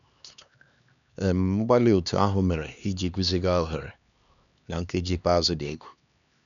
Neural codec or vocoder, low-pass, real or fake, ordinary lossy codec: codec, 16 kHz, 2 kbps, X-Codec, HuBERT features, trained on LibriSpeech; 7.2 kHz; fake; none